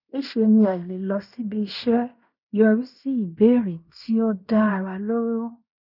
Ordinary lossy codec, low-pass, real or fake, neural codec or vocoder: none; 5.4 kHz; fake; codec, 16 kHz in and 24 kHz out, 0.9 kbps, LongCat-Audio-Codec, fine tuned four codebook decoder